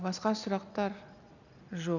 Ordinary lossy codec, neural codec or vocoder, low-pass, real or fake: none; none; 7.2 kHz; real